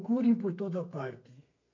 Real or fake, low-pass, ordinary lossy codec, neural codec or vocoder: fake; 7.2 kHz; MP3, 48 kbps; codec, 32 kHz, 1.9 kbps, SNAC